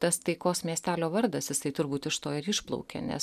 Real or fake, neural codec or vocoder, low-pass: real; none; 14.4 kHz